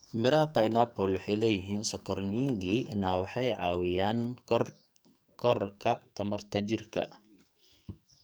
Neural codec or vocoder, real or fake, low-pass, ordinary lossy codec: codec, 44.1 kHz, 2.6 kbps, SNAC; fake; none; none